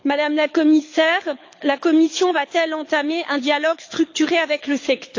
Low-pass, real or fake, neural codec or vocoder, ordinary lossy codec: 7.2 kHz; fake; codec, 24 kHz, 6 kbps, HILCodec; AAC, 48 kbps